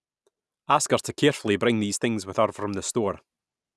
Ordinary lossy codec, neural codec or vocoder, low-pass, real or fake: none; none; none; real